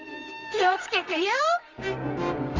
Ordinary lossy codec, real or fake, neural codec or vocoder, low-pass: Opus, 32 kbps; fake; codec, 24 kHz, 0.9 kbps, WavTokenizer, medium music audio release; 7.2 kHz